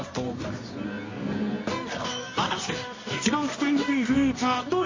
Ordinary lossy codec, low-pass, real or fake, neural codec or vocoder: MP3, 32 kbps; 7.2 kHz; fake; codec, 24 kHz, 0.9 kbps, WavTokenizer, medium music audio release